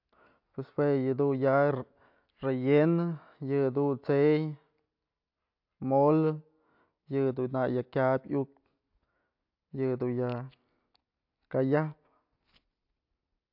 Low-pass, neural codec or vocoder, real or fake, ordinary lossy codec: 5.4 kHz; none; real; AAC, 48 kbps